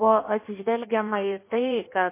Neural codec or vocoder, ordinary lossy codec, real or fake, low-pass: codec, 16 kHz in and 24 kHz out, 1.1 kbps, FireRedTTS-2 codec; MP3, 24 kbps; fake; 3.6 kHz